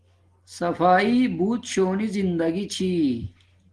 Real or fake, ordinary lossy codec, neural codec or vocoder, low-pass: real; Opus, 16 kbps; none; 10.8 kHz